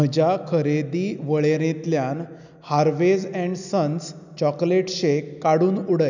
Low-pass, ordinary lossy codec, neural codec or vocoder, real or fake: 7.2 kHz; none; none; real